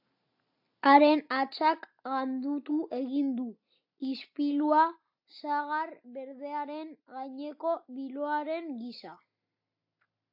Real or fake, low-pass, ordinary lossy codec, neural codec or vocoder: real; 5.4 kHz; AAC, 48 kbps; none